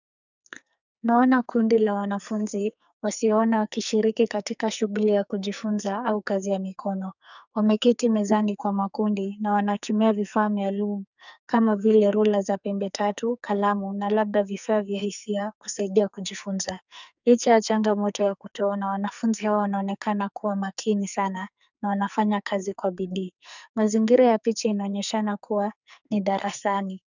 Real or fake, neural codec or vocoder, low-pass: fake; codec, 44.1 kHz, 2.6 kbps, SNAC; 7.2 kHz